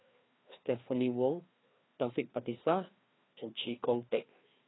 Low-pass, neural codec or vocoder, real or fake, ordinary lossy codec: 7.2 kHz; codec, 16 kHz, 2 kbps, FreqCodec, larger model; fake; AAC, 16 kbps